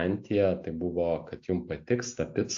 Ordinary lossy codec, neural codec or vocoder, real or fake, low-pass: AAC, 48 kbps; none; real; 7.2 kHz